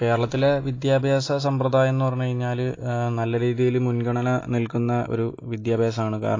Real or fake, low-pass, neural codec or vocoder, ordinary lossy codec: real; 7.2 kHz; none; AAC, 32 kbps